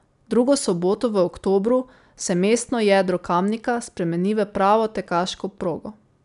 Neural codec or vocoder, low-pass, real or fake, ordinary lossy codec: none; 10.8 kHz; real; none